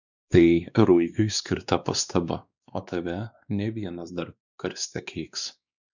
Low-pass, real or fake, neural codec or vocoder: 7.2 kHz; fake; codec, 16 kHz, 2 kbps, X-Codec, WavLM features, trained on Multilingual LibriSpeech